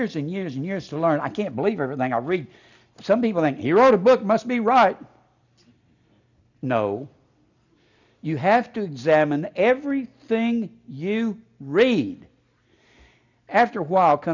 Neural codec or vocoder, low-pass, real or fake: none; 7.2 kHz; real